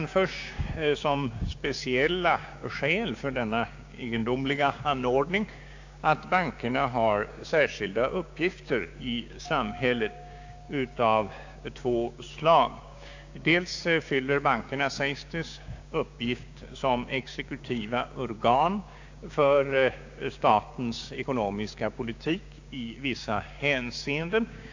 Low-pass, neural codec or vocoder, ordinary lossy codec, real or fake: 7.2 kHz; codec, 16 kHz, 6 kbps, DAC; AAC, 48 kbps; fake